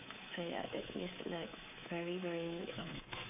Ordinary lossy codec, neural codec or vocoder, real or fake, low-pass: AAC, 24 kbps; codec, 24 kHz, 3.1 kbps, DualCodec; fake; 3.6 kHz